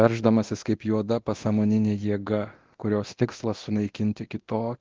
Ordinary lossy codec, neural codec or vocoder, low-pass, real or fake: Opus, 16 kbps; codec, 24 kHz, 0.9 kbps, DualCodec; 7.2 kHz; fake